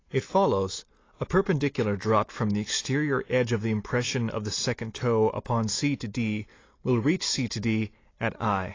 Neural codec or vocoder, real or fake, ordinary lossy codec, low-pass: none; real; AAC, 32 kbps; 7.2 kHz